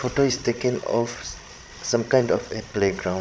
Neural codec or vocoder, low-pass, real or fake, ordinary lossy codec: codec, 16 kHz, 16 kbps, FreqCodec, larger model; none; fake; none